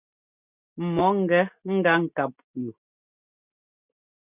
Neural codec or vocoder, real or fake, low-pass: none; real; 3.6 kHz